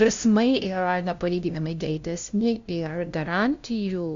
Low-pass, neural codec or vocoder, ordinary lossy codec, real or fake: 7.2 kHz; codec, 16 kHz, 0.5 kbps, FunCodec, trained on LibriTTS, 25 frames a second; Opus, 64 kbps; fake